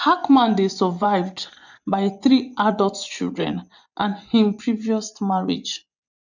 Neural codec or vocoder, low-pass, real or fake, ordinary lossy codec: vocoder, 24 kHz, 100 mel bands, Vocos; 7.2 kHz; fake; none